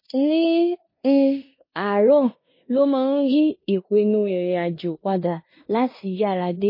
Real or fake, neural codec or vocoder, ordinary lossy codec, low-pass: fake; codec, 16 kHz in and 24 kHz out, 0.9 kbps, LongCat-Audio-Codec, four codebook decoder; MP3, 24 kbps; 5.4 kHz